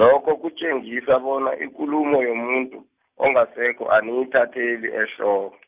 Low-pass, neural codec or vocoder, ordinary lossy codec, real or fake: 3.6 kHz; none; Opus, 24 kbps; real